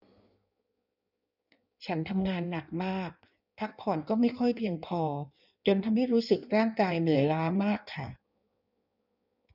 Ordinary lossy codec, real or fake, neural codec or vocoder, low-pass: none; fake; codec, 16 kHz in and 24 kHz out, 1.1 kbps, FireRedTTS-2 codec; 5.4 kHz